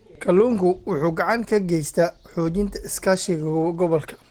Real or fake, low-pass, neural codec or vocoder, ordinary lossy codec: real; 19.8 kHz; none; Opus, 16 kbps